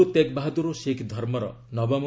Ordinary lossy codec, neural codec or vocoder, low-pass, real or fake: none; none; none; real